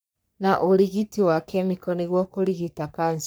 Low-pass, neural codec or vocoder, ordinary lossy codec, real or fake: none; codec, 44.1 kHz, 3.4 kbps, Pupu-Codec; none; fake